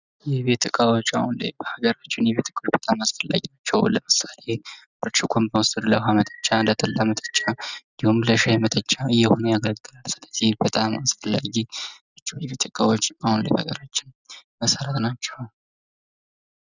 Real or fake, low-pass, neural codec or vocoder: real; 7.2 kHz; none